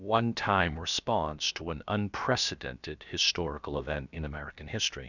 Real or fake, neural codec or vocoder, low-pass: fake; codec, 16 kHz, about 1 kbps, DyCAST, with the encoder's durations; 7.2 kHz